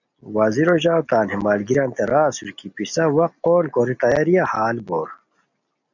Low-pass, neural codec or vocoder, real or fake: 7.2 kHz; none; real